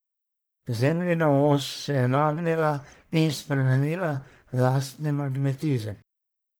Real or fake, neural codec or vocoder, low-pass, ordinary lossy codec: fake; codec, 44.1 kHz, 1.7 kbps, Pupu-Codec; none; none